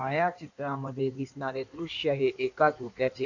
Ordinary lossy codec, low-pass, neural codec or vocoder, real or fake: none; 7.2 kHz; codec, 16 kHz in and 24 kHz out, 1.1 kbps, FireRedTTS-2 codec; fake